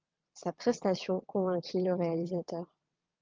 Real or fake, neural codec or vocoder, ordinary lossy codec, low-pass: fake; vocoder, 22.05 kHz, 80 mel bands, WaveNeXt; Opus, 24 kbps; 7.2 kHz